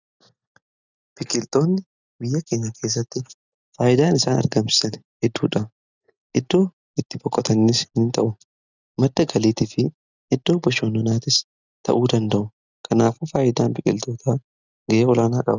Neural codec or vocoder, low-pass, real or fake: none; 7.2 kHz; real